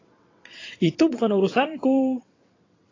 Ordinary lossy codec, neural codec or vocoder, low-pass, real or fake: AAC, 32 kbps; vocoder, 22.05 kHz, 80 mel bands, WaveNeXt; 7.2 kHz; fake